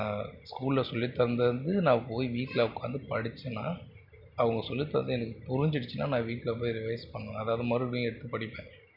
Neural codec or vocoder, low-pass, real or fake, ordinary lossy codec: none; 5.4 kHz; real; none